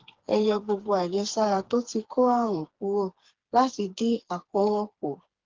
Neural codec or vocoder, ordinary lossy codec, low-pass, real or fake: codec, 16 kHz, 4 kbps, FreqCodec, smaller model; Opus, 16 kbps; 7.2 kHz; fake